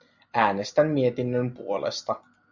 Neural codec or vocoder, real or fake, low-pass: none; real; 7.2 kHz